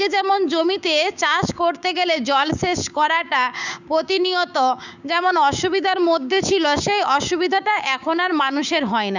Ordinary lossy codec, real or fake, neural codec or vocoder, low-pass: none; fake; codec, 16 kHz, 6 kbps, DAC; 7.2 kHz